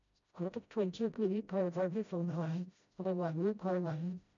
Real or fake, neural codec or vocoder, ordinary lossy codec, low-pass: fake; codec, 16 kHz, 0.5 kbps, FreqCodec, smaller model; MP3, 64 kbps; 7.2 kHz